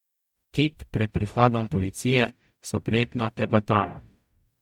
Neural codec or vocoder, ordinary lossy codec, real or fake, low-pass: codec, 44.1 kHz, 0.9 kbps, DAC; MP3, 96 kbps; fake; 19.8 kHz